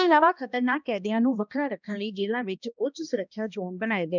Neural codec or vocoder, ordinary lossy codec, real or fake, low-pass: codec, 16 kHz, 1 kbps, X-Codec, HuBERT features, trained on balanced general audio; none; fake; 7.2 kHz